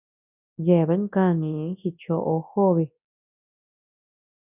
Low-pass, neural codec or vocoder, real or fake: 3.6 kHz; codec, 24 kHz, 0.9 kbps, WavTokenizer, large speech release; fake